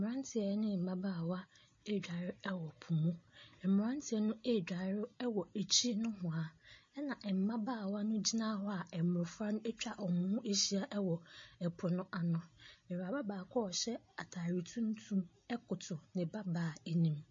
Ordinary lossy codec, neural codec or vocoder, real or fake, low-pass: MP3, 32 kbps; none; real; 7.2 kHz